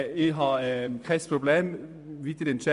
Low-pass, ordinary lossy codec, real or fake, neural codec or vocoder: 10.8 kHz; none; fake; vocoder, 24 kHz, 100 mel bands, Vocos